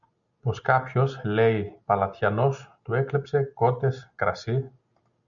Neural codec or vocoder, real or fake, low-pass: none; real; 7.2 kHz